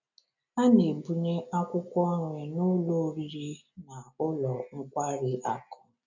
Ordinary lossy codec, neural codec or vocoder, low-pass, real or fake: none; none; 7.2 kHz; real